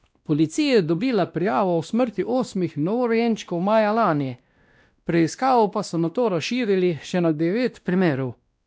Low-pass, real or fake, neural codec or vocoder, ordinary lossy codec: none; fake; codec, 16 kHz, 1 kbps, X-Codec, WavLM features, trained on Multilingual LibriSpeech; none